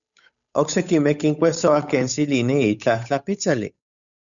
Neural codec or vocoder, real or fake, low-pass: codec, 16 kHz, 8 kbps, FunCodec, trained on Chinese and English, 25 frames a second; fake; 7.2 kHz